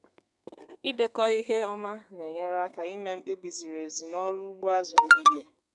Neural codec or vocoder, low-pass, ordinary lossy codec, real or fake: codec, 32 kHz, 1.9 kbps, SNAC; 10.8 kHz; none; fake